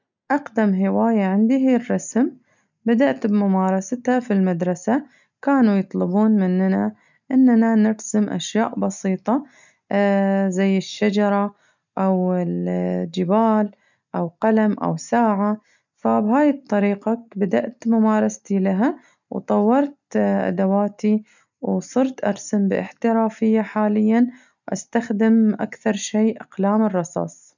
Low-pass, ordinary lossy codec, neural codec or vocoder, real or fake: 7.2 kHz; none; none; real